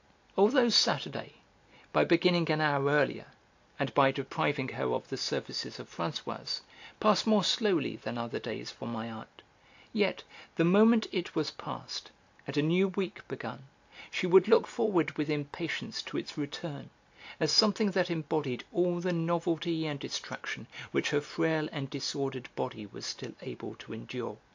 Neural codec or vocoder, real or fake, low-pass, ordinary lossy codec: none; real; 7.2 kHz; AAC, 48 kbps